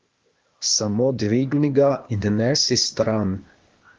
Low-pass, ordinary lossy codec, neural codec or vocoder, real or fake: 7.2 kHz; Opus, 24 kbps; codec, 16 kHz, 0.8 kbps, ZipCodec; fake